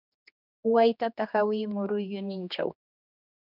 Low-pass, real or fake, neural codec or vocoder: 5.4 kHz; fake; codec, 16 kHz, 2 kbps, X-Codec, HuBERT features, trained on general audio